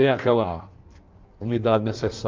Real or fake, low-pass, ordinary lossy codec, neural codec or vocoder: fake; 7.2 kHz; Opus, 24 kbps; codec, 16 kHz in and 24 kHz out, 1.1 kbps, FireRedTTS-2 codec